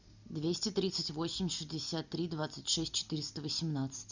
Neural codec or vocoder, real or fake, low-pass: none; real; 7.2 kHz